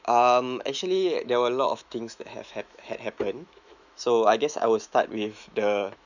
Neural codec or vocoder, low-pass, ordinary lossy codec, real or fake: autoencoder, 48 kHz, 128 numbers a frame, DAC-VAE, trained on Japanese speech; 7.2 kHz; none; fake